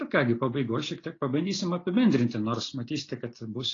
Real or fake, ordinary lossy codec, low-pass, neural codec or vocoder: real; AAC, 32 kbps; 7.2 kHz; none